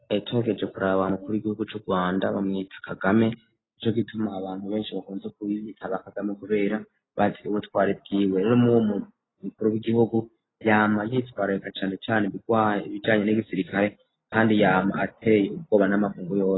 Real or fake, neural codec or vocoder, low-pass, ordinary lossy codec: real; none; 7.2 kHz; AAC, 16 kbps